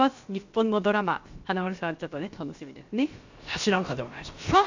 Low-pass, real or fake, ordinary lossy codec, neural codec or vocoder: 7.2 kHz; fake; Opus, 64 kbps; codec, 16 kHz, about 1 kbps, DyCAST, with the encoder's durations